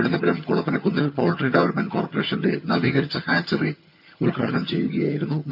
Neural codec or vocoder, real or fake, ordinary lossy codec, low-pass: vocoder, 22.05 kHz, 80 mel bands, HiFi-GAN; fake; none; 5.4 kHz